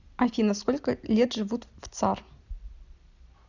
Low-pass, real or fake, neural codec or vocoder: 7.2 kHz; real; none